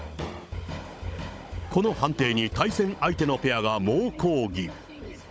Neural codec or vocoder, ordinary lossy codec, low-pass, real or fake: codec, 16 kHz, 16 kbps, FunCodec, trained on Chinese and English, 50 frames a second; none; none; fake